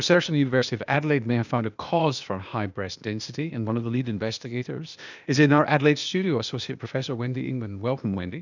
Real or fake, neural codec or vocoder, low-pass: fake; codec, 16 kHz, 0.8 kbps, ZipCodec; 7.2 kHz